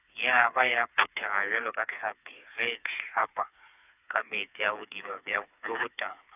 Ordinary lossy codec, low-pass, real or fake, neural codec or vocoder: none; 3.6 kHz; fake; codec, 16 kHz, 4 kbps, FreqCodec, smaller model